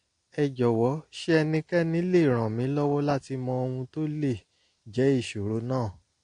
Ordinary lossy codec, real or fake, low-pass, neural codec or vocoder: AAC, 48 kbps; real; 9.9 kHz; none